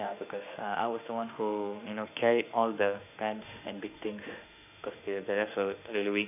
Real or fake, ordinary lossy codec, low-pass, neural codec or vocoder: fake; none; 3.6 kHz; autoencoder, 48 kHz, 32 numbers a frame, DAC-VAE, trained on Japanese speech